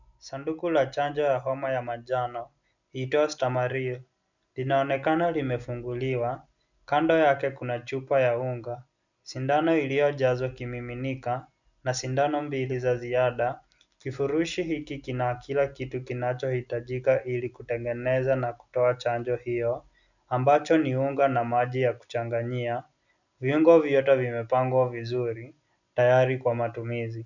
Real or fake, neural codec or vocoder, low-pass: real; none; 7.2 kHz